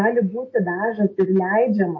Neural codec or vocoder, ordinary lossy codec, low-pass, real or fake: none; MP3, 32 kbps; 7.2 kHz; real